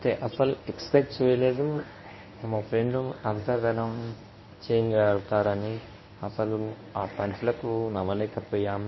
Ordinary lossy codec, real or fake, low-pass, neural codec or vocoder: MP3, 24 kbps; fake; 7.2 kHz; codec, 24 kHz, 0.9 kbps, WavTokenizer, medium speech release version 1